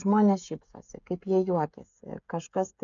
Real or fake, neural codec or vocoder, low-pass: fake; codec, 16 kHz, 16 kbps, FreqCodec, smaller model; 7.2 kHz